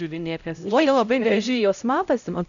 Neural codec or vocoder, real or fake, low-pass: codec, 16 kHz, 0.5 kbps, X-Codec, HuBERT features, trained on LibriSpeech; fake; 7.2 kHz